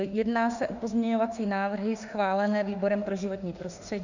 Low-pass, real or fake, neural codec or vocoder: 7.2 kHz; fake; autoencoder, 48 kHz, 32 numbers a frame, DAC-VAE, trained on Japanese speech